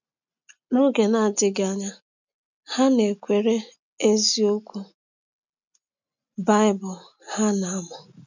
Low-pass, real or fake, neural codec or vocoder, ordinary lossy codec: 7.2 kHz; real; none; none